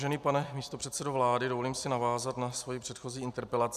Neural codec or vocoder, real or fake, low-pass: none; real; 14.4 kHz